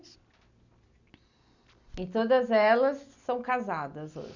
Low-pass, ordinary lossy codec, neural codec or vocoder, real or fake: 7.2 kHz; none; none; real